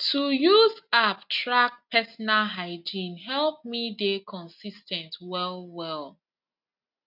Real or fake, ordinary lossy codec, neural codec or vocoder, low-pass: real; none; none; 5.4 kHz